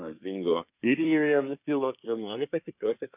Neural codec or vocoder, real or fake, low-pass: codec, 24 kHz, 1 kbps, SNAC; fake; 3.6 kHz